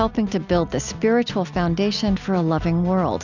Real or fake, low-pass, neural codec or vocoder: real; 7.2 kHz; none